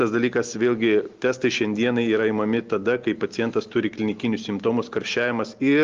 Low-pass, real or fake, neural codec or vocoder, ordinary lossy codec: 7.2 kHz; real; none; Opus, 32 kbps